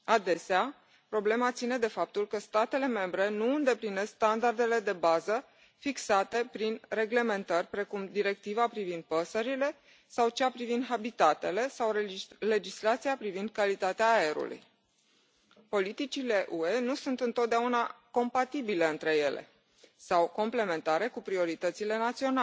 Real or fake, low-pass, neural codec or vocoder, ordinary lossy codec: real; none; none; none